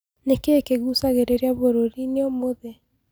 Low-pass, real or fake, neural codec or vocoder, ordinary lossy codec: none; real; none; none